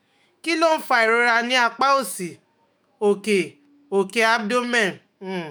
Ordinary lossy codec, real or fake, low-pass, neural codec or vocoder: none; fake; none; autoencoder, 48 kHz, 128 numbers a frame, DAC-VAE, trained on Japanese speech